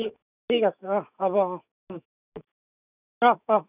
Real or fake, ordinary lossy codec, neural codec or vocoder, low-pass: fake; none; autoencoder, 48 kHz, 128 numbers a frame, DAC-VAE, trained on Japanese speech; 3.6 kHz